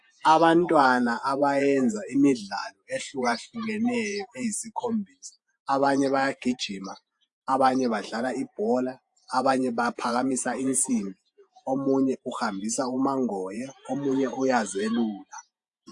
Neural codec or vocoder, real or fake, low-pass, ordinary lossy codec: vocoder, 48 kHz, 128 mel bands, Vocos; fake; 10.8 kHz; AAC, 64 kbps